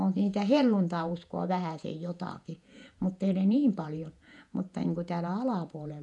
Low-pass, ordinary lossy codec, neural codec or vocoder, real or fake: 10.8 kHz; none; vocoder, 48 kHz, 128 mel bands, Vocos; fake